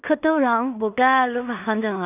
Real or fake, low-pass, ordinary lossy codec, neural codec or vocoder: fake; 3.6 kHz; none; codec, 16 kHz in and 24 kHz out, 0.4 kbps, LongCat-Audio-Codec, two codebook decoder